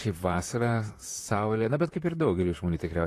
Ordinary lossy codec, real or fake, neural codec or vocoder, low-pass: AAC, 48 kbps; fake; vocoder, 44.1 kHz, 128 mel bands, Pupu-Vocoder; 14.4 kHz